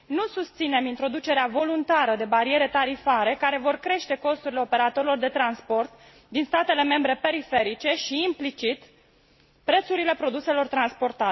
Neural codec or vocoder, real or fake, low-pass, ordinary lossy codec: none; real; 7.2 kHz; MP3, 24 kbps